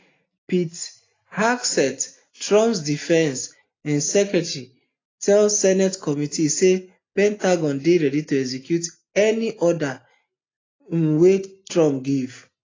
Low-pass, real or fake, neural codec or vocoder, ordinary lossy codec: 7.2 kHz; real; none; AAC, 32 kbps